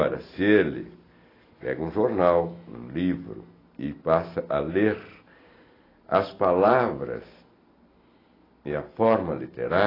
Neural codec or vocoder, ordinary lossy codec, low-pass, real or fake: none; AAC, 24 kbps; 5.4 kHz; real